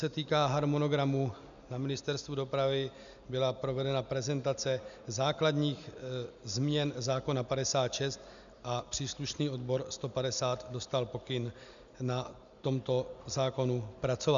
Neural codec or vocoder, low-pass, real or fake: none; 7.2 kHz; real